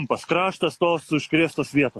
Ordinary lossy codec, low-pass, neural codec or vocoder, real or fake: AAC, 48 kbps; 14.4 kHz; none; real